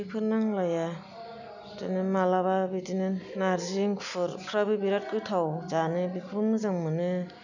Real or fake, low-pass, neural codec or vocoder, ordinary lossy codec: real; 7.2 kHz; none; none